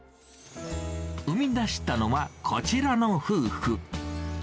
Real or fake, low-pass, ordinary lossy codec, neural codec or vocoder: real; none; none; none